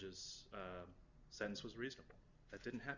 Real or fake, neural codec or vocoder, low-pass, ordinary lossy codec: real; none; 7.2 kHz; Opus, 64 kbps